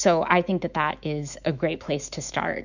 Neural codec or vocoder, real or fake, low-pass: autoencoder, 48 kHz, 128 numbers a frame, DAC-VAE, trained on Japanese speech; fake; 7.2 kHz